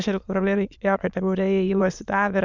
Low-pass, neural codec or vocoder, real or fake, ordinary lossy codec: 7.2 kHz; autoencoder, 22.05 kHz, a latent of 192 numbers a frame, VITS, trained on many speakers; fake; Opus, 64 kbps